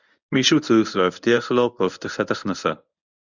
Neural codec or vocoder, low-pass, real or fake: codec, 24 kHz, 0.9 kbps, WavTokenizer, medium speech release version 1; 7.2 kHz; fake